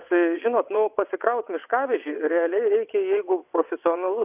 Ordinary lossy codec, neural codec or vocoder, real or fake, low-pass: AAC, 32 kbps; vocoder, 22.05 kHz, 80 mel bands, WaveNeXt; fake; 3.6 kHz